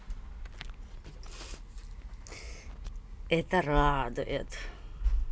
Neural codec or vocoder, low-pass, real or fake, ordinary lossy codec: none; none; real; none